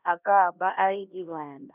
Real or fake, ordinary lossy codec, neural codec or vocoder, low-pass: fake; none; codec, 16 kHz, 1 kbps, X-Codec, HuBERT features, trained on LibriSpeech; 3.6 kHz